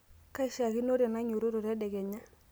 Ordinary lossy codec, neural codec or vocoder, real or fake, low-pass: none; none; real; none